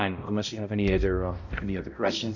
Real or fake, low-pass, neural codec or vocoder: fake; 7.2 kHz; codec, 16 kHz, 0.5 kbps, X-Codec, HuBERT features, trained on balanced general audio